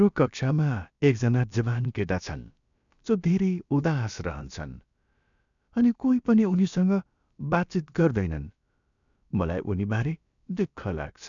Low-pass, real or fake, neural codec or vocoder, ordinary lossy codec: 7.2 kHz; fake; codec, 16 kHz, about 1 kbps, DyCAST, with the encoder's durations; none